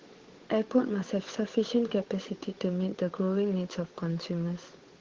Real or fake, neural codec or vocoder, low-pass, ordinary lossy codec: fake; codec, 16 kHz, 8 kbps, FunCodec, trained on Chinese and English, 25 frames a second; 7.2 kHz; Opus, 16 kbps